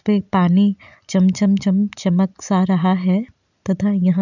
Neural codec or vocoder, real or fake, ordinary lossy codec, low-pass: none; real; none; 7.2 kHz